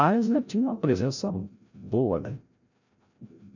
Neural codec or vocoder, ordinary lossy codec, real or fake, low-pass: codec, 16 kHz, 0.5 kbps, FreqCodec, larger model; none; fake; 7.2 kHz